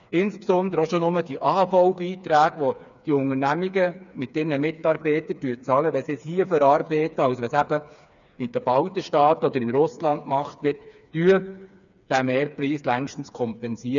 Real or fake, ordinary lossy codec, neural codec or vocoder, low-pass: fake; none; codec, 16 kHz, 4 kbps, FreqCodec, smaller model; 7.2 kHz